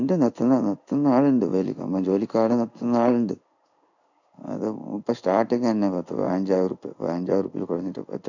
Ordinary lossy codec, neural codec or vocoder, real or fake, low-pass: none; codec, 16 kHz in and 24 kHz out, 1 kbps, XY-Tokenizer; fake; 7.2 kHz